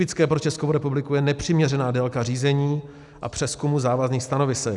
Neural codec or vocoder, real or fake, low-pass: none; real; 10.8 kHz